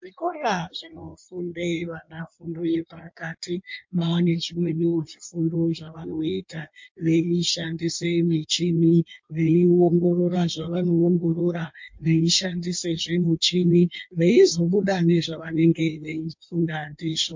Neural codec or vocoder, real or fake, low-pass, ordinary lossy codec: codec, 16 kHz in and 24 kHz out, 1.1 kbps, FireRedTTS-2 codec; fake; 7.2 kHz; MP3, 48 kbps